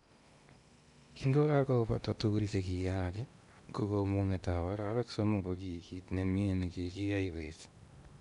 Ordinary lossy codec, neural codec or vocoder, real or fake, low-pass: none; codec, 16 kHz in and 24 kHz out, 0.8 kbps, FocalCodec, streaming, 65536 codes; fake; 10.8 kHz